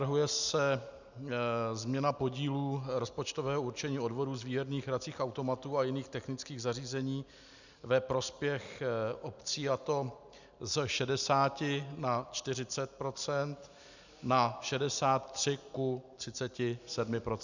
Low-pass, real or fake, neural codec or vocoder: 7.2 kHz; real; none